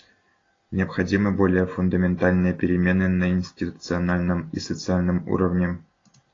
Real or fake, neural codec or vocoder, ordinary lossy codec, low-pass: real; none; AAC, 32 kbps; 7.2 kHz